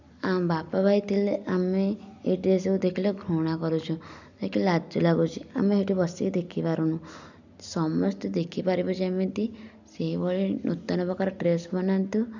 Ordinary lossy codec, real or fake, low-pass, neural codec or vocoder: none; real; 7.2 kHz; none